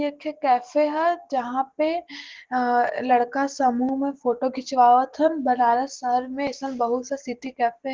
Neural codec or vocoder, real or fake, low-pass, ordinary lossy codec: none; real; 7.2 kHz; Opus, 16 kbps